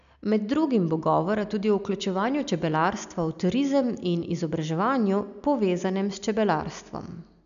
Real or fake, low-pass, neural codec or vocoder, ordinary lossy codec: real; 7.2 kHz; none; none